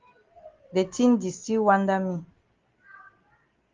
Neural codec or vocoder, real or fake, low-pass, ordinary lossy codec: none; real; 7.2 kHz; Opus, 32 kbps